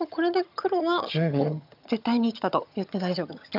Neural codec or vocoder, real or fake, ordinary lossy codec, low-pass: vocoder, 22.05 kHz, 80 mel bands, HiFi-GAN; fake; none; 5.4 kHz